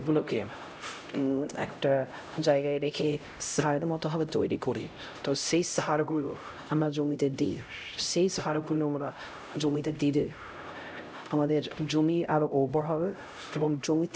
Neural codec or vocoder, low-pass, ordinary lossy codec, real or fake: codec, 16 kHz, 0.5 kbps, X-Codec, HuBERT features, trained on LibriSpeech; none; none; fake